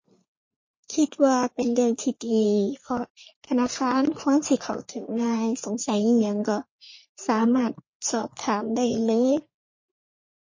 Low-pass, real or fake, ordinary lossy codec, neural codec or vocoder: 7.2 kHz; fake; MP3, 32 kbps; codec, 44.1 kHz, 3.4 kbps, Pupu-Codec